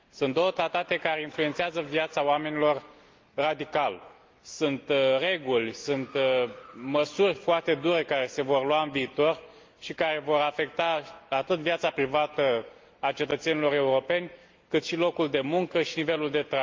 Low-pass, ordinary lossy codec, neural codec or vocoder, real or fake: 7.2 kHz; Opus, 24 kbps; none; real